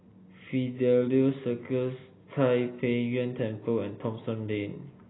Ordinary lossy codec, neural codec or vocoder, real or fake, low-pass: AAC, 16 kbps; none; real; 7.2 kHz